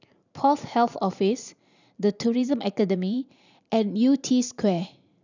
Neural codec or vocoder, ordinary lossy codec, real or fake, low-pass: vocoder, 44.1 kHz, 128 mel bands every 512 samples, BigVGAN v2; none; fake; 7.2 kHz